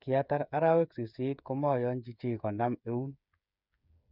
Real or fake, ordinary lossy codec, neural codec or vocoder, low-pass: fake; none; codec, 16 kHz, 8 kbps, FreqCodec, smaller model; 5.4 kHz